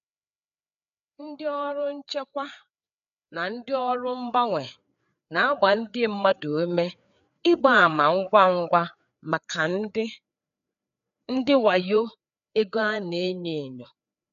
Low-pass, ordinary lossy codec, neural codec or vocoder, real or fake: 7.2 kHz; none; codec, 16 kHz, 4 kbps, FreqCodec, larger model; fake